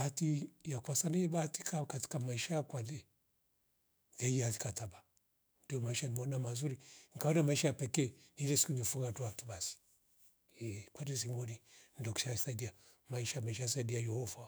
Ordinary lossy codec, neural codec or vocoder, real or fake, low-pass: none; autoencoder, 48 kHz, 128 numbers a frame, DAC-VAE, trained on Japanese speech; fake; none